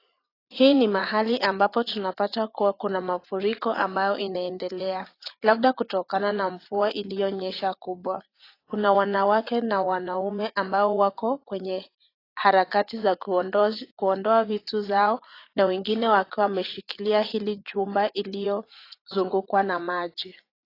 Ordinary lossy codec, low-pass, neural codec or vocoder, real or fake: AAC, 24 kbps; 5.4 kHz; vocoder, 44.1 kHz, 80 mel bands, Vocos; fake